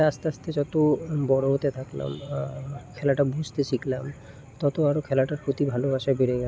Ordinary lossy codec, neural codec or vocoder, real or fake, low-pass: none; none; real; none